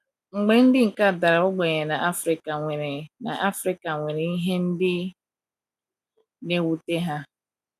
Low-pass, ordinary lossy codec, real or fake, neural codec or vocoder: 14.4 kHz; none; real; none